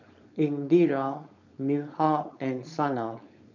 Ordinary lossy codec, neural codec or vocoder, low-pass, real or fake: none; codec, 16 kHz, 4.8 kbps, FACodec; 7.2 kHz; fake